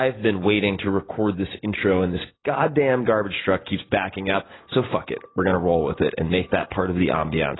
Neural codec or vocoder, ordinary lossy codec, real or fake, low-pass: none; AAC, 16 kbps; real; 7.2 kHz